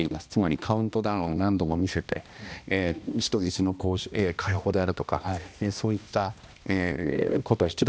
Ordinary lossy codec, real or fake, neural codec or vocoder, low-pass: none; fake; codec, 16 kHz, 1 kbps, X-Codec, HuBERT features, trained on balanced general audio; none